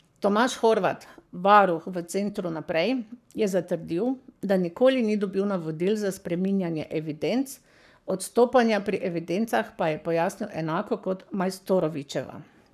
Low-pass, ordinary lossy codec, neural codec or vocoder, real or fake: 14.4 kHz; none; codec, 44.1 kHz, 7.8 kbps, Pupu-Codec; fake